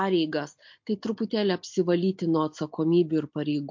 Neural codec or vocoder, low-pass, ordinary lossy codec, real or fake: none; 7.2 kHz; MP3, 48 kbps; real